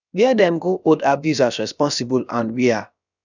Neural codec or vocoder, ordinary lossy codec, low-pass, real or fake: codec, 16 kHz, about 1 kbps, DyCAST, with the encoder's durations; none; 7.2 kHz; fake